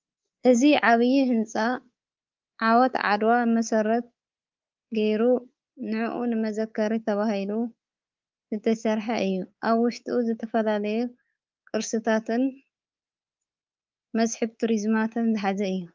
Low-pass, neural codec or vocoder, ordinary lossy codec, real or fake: 7.2 kHz; codec, 24 kHz, 3.1 kbps, DualCodec; Opus, 32 kbps; fake